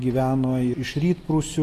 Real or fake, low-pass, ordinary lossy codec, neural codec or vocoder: real; 14.4 kHz; AAC, 48 kbps; none